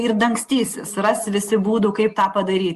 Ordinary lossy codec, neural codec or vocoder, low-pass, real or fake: Opus, 64 kbps; none; 14.4 kHz; real